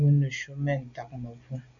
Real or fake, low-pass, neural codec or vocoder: real; 7.2 kHz; none